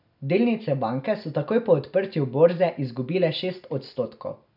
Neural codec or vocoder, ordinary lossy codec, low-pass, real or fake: none; none; 5.4 kHz; real